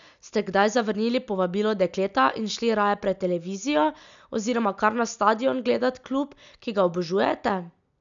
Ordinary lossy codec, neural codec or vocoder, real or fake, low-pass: none; none; real; 7.2 kHz